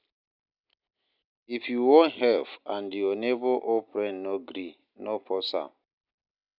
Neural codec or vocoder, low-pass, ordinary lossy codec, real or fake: none; 5.4 kHz; none; real